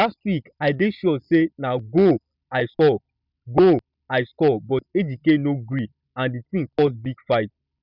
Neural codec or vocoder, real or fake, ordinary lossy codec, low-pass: none; real; none; 5.4 kHz